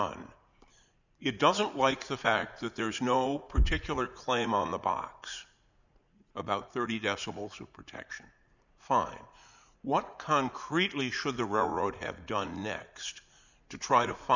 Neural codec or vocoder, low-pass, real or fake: vocoder, 44.1 kHz, 80 mel bands, Vocos; 7.2 kHz; fake